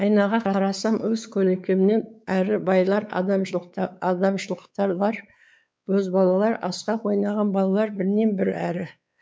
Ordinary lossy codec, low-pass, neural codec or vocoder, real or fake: none; none; codec, 16 kHz, 4 kbps, X-Codec, WavLM features, trained on Multilingual LibriSpeech; fake